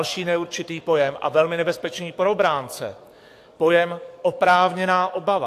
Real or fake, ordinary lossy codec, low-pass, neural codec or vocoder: fake; AAC, 64 kbps; 14.4 kHz; codec, 44.1 kHz, 7.8 kbps, DAC